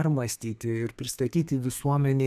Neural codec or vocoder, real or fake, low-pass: codec, 32 kHz, 1.9 kbps, SNAC; fake; 14.4 kHz